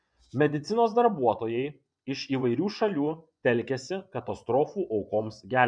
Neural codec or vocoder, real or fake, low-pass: vocoder, 24 kHz, 100 mel bands, Vocos; fake; 9.9 kHz